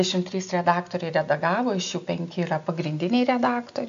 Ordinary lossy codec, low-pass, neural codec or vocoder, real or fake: AAC, 64 kbps; 7.2 kHz; none; real